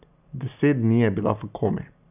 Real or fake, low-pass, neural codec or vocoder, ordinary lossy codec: real; 3.6 kHz; none; none